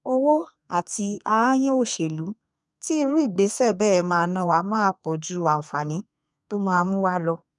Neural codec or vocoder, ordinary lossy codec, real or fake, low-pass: codec, 32 kHz, 1.9 kbps, SNAC; none; fake; 10.8 kHz